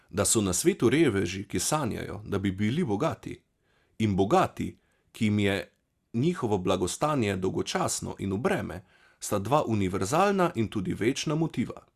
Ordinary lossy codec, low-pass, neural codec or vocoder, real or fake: Opus, 64 kbps; 14.4 kHz; none; real